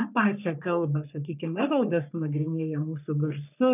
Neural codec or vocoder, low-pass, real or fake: codec, 44.1 kHz, 3.4 kbps, Pupu-Codec; 3.6 kHz; fake